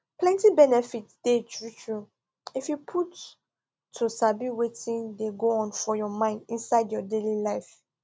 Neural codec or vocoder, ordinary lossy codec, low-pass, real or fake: none; none; none; real